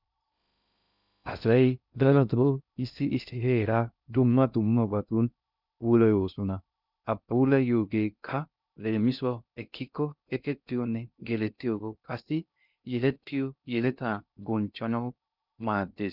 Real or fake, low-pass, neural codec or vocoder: fake; 5.4 kHz; codec, 16 kHz in and 24 kHz out, 0.6 kbps, FocalCodec, streaming, 2048 codes